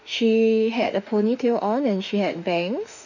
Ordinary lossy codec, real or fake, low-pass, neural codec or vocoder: none; fake; 7.2 kHz; autoencoder, 48 kHz, 32 numbers a frame, DAC-VAE, trained on Japanese speech